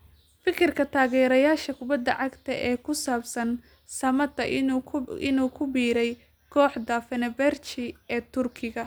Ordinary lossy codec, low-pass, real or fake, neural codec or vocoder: none; none; real; none